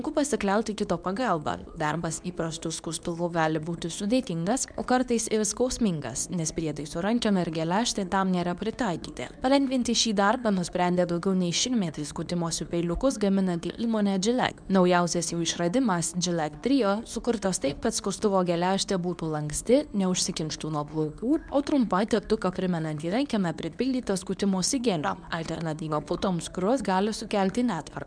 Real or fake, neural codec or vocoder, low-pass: fake; codec, 24 kHz, 0.9 kbps, WavTokenizer, medium speech release version 2; 9.9 kHz